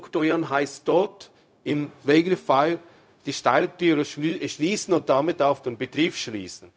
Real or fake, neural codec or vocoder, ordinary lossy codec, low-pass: fake; codec, 16 kHz, 0.4 kbps, LongCat-Audio-Codec; none; none